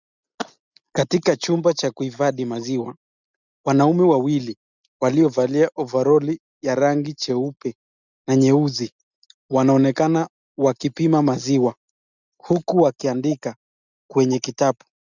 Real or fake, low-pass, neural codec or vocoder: real; 7.2 kHz; none